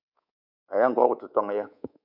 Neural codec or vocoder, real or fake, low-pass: codec, 24 kHz, 3.1 kbps, DualCodec; fake; 5.4 kHz